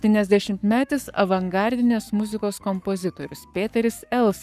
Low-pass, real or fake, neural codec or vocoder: 14.4 kHz; fake; codec, 44.1 kHz, 7.8 kbps, Pupu-Codec